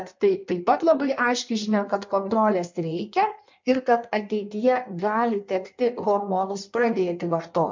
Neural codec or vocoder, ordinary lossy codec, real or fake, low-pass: codec, 16 kHz in and 24 kHz out, 1.1 kbps, FireRedTTS-2 codec; MP3, 48 kbps; fake; 7.2 kHz